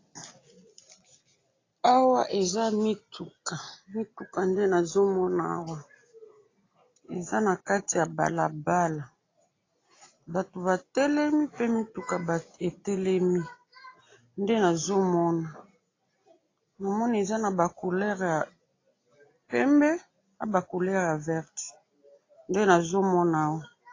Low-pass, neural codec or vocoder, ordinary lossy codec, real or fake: 7.2 kHz; none; AAC, 32 kbps; real